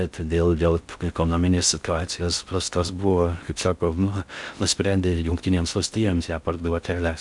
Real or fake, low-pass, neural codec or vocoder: fake; 10.8 kHz; codec, 16 kHz in and 24 kHz out, 0.6 kbps, FocalCodec, streaming, 4096 codes